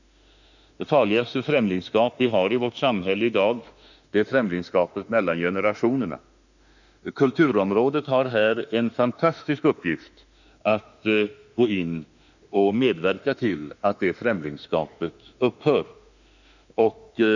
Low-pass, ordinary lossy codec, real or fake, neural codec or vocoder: 7.2 kHz; none; fake; autoencoder, 48 kHz, 32 numbers a frame, DAC-VAE, trained on Japanese speech